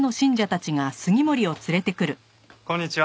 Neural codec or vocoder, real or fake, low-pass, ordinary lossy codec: none; real; none; none